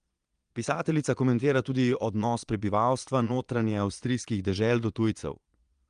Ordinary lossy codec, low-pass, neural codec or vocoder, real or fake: Opus, 32 kbps; 9.9 kHz; vocoder, 22.05 kHz, 80 mel bands, Vocos; fake